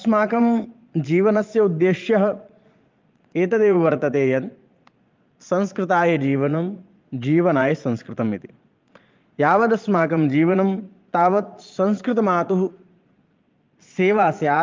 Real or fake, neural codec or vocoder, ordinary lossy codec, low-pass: fake; vocoder, 22.05 kHz, 80 mel bands, Vocos; Opus, 24 kbps; 7.2 kHz